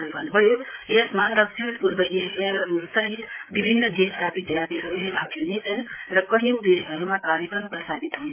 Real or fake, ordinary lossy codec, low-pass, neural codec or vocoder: fake; MP3, 24 kbps; 3.6 kHz; codec, 16 kHz, 4 kbps, FreqCodec, larger model